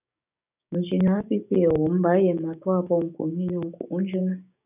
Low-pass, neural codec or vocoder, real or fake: 3.6 kHz; codec, 16 kHz, 6 kbps, DAC; fake